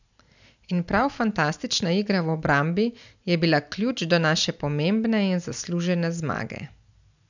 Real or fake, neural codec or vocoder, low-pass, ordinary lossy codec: real; none; 7.2 kHz; none